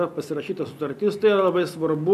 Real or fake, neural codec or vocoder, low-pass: real; none; 14.4 kHz